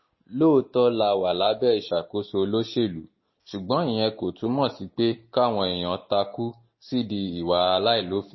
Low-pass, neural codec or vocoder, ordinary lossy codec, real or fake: 7.2 kHz; none; MP3, 24 kbps; real